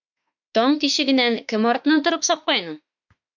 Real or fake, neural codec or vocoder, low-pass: fake; autoencoder, 48 kHz, 32 numbers a frame, DAC-VAE, trained on Japanese speech; 7.2 kHz